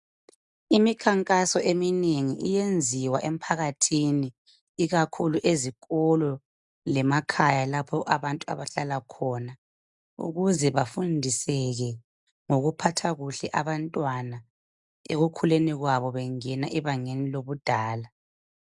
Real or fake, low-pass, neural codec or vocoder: real; 10.8 kHz; none